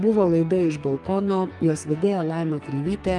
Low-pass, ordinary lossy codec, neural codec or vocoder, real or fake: 10.8 kHz; Opus, 32 kbps; codec, 44.1 kHz, 2.6 kbps, SNAC; fake